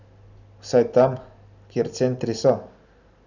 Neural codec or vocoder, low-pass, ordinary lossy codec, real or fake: none; 7.2 kHz; none; real